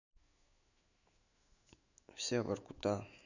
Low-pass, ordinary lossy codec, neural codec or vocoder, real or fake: 7.2 kHz; none; autoencoder, 48 kHz, 128 numbers a frame, DAC-VAE, trained on Japanese speech; fake